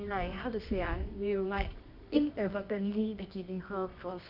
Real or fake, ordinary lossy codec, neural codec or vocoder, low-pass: fake; none; codec, 24 kHz, 0.9 kbps, WavTokenizer, medium music audio release; 5.4 kHz